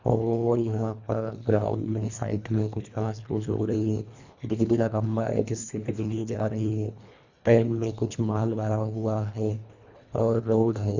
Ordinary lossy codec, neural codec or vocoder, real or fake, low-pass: Opus, 64 kbps; codec, 24 kHz, 1.5 kbps, HILCodec; fake; 7.2 kHz